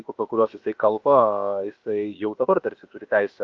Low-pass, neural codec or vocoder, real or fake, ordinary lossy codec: 7.2 kHz; codec, 16 kHz, about 1 kbps, DyCAST, with the encoder's durations; fake; Opus, 24 kbps